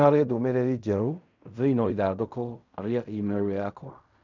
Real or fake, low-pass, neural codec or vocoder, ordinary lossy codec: fake; 7.2 kHz; codec, 16 kHz in and 24 kHz out, 0.4 kbps, LongCat-Audio-Codec, fine tuned four codebook decoder; none